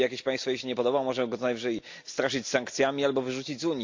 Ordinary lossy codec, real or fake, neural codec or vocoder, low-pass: MP3, 48 kbps; real; none; 7.2 kHz